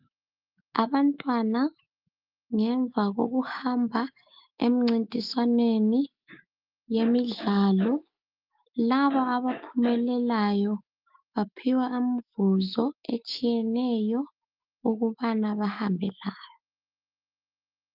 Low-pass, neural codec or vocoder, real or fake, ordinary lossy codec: 5.4 kHz; autoencoder, 48 kHz, 128 numbers a frame, DAC-VAE, trained on Japanese speech; fake; Opus, 32 kbps